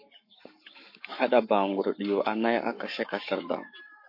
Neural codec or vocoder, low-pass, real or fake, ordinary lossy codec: codec, 44.1 kHz, 7.8 kbps, Pupu-Codec; 5.4 kHz; fake; MP3, 32 kbps